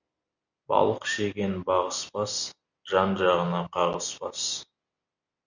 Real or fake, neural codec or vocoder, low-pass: real; none; 7.2 kHz